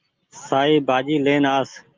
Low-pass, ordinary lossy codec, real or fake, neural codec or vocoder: 7.2 kHz; Opus, 32 kbps; real; none